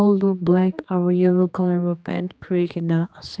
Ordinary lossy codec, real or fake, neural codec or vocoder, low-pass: none; fake; codec, 16 kHz, 2 kbps, X-Codec, HuBERT features, trained on general audio; none